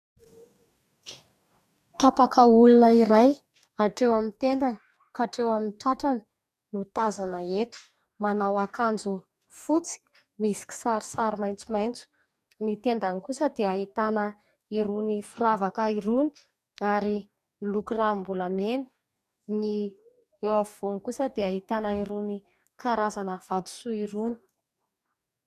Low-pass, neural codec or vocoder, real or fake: 14.4 kHz; codec, 44.1 kHz, 2.6 kbps, DAC; fake